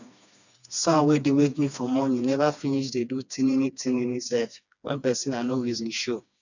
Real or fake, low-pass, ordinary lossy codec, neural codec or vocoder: fake; 7.2 kHz; none; codec, 16 kHz, 2 kbps, FreqCodec, smaller model